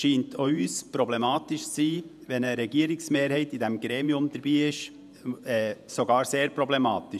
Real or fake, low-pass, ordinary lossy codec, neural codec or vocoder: fake; 14.4 kHz; none; vocoder, 48 kHz, 128 mel bands, Vocos